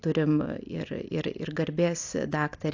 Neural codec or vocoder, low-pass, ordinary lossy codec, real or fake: none; 7.2 kHz; AAC, 48 kbps; real